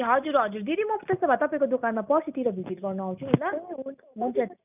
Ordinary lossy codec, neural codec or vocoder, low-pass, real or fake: none; none; 3.6 kHz; real